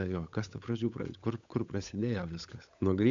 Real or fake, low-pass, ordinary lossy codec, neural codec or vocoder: fake; 7.2 kHz; MP3, 64 kbps; codec, 16 kHz, 8 kbps, FunCodec, trained on Chinese and English, 25 frames a second